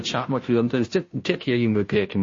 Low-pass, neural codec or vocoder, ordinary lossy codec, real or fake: 7.2 kHz; codec, 16 kHz, 0.5 kbps, FunCodec, trained on Chinese and English, 25 frames a second; MP3, 32 kbps; fake